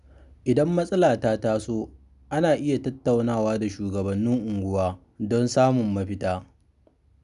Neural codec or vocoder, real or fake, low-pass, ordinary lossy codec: none; real; 10.8 kHz; none